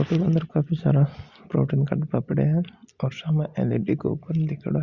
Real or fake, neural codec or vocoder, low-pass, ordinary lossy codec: real; none; 7.2 kHz; Opus, 64 kbps